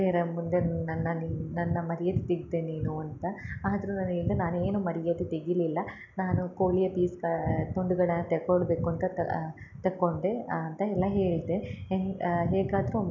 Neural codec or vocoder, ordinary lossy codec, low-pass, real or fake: none; none; 7.2 kHz; real